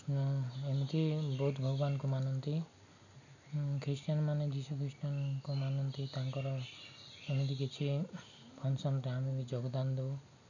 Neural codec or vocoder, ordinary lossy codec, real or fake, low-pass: none; none; real; 7.2 kHz